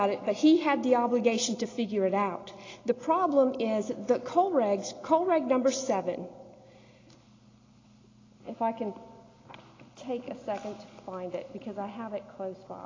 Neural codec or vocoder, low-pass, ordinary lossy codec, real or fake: none; 7.2 kHz; AAC, 32 kbps; real